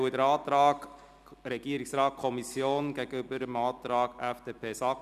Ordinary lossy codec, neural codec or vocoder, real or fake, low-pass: none; none; real; 14.4 kHz